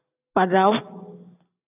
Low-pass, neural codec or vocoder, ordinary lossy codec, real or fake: 3.6 kHz; none; AAC, 32 kbps; real